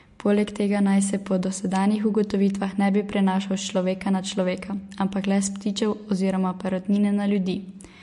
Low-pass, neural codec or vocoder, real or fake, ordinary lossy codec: 14.4 kHz; none; real; MP3, 48 kbps